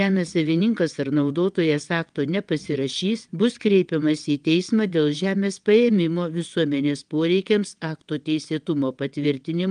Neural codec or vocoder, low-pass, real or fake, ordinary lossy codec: vocoder, 22.05 kHz, 80 mel bands, WaveNeXt; 9.9 kHz; fake; Opus, 32 kbps